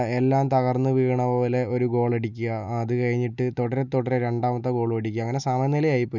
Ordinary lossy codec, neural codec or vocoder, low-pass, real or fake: none; none; 7.2 kHz; real